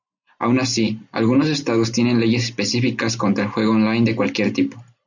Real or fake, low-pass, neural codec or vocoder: real; 7.2 kHz; none